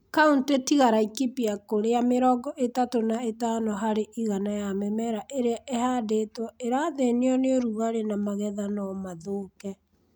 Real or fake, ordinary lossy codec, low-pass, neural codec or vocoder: real; none; none; none